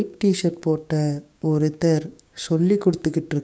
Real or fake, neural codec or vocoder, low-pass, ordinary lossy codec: fake; codec, 16 kHz, 6 kbps, DAC; none; none